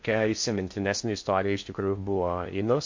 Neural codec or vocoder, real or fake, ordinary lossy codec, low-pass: codec, 16 kHz in and 24 kHz out, 0.6 kbps, FocalCodec, streaming, 4096 codes; fake; MP3, 48 kbps; 7.2 kHz